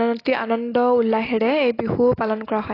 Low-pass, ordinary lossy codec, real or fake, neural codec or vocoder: 5.4 kHz; AAC, 24 kbps; real; none